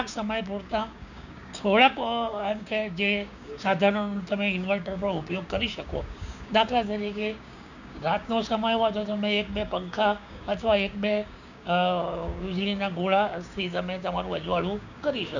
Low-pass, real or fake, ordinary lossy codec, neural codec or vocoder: 7.2 kHz; fake; none; codec, 16 kHz, 6 kbps, DAC